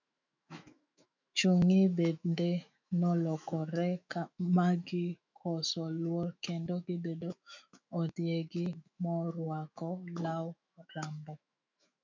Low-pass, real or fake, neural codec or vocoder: 7.2 kHz; fake; autoencoder, 48 kHz, 128 numbers a frame, DAC-VAE, trained on Japanese speech